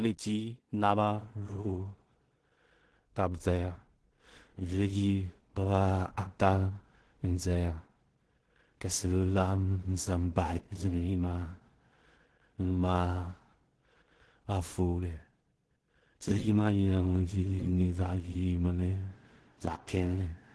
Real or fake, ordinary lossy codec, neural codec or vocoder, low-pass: fake; Opus, 16 kbps; codec, 16 kHz in and 24 kHz out, 0.4 kbps, LongCat-Audio-Codec, two codebook decoder; 10.8 kHz